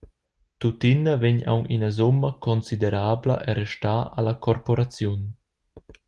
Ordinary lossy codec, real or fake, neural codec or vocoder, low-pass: Opus, 24 kbps; real; none; 10.8 kHz